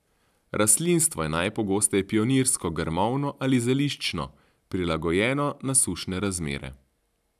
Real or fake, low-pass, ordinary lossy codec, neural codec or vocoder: real; 14.4 kHz; none; none